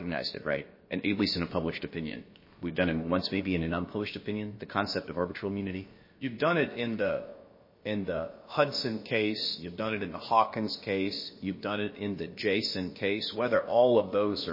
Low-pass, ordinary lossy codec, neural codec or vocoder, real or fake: 5.4 kHz; MP3, 24 kbps; codec, 16 kHz, about 1 kbps, DyCAST, with the encoder's durations; fake